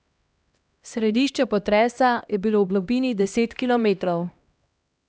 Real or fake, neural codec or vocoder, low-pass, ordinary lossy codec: fake; codec, 16 kHz, 1 kbps, X-Codec, HuBERT features, trained on LibriSpeech; none; none